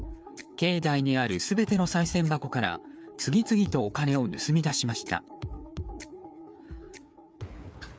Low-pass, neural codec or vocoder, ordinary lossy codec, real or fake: none; codec, 16 kHz, 4 kbps, FreqCodec, larger model; none; fake